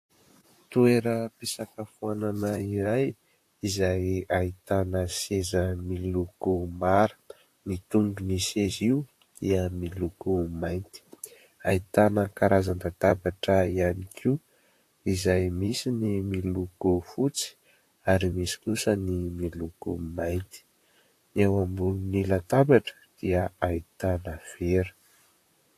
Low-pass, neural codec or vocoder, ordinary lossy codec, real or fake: 14.4 kHz; vocoder, 44.1 kHz, 128 mel bands, Pupu-Vocoder; AAC, 64 kbps; fake